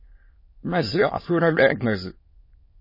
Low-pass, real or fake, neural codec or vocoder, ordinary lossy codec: 5.4 kHz; fake; autoencoder, 22.05 kHz, a latent of 192 numbers a frame, VITS, trained on many speakers; MP3, 24 kbps